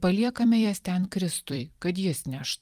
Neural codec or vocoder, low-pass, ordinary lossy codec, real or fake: vocoder, 44.1 kHz, 128 mel bands every 256 samples, BigVGAN v2; 14.4 kHz; Opus, 32 kbps; fake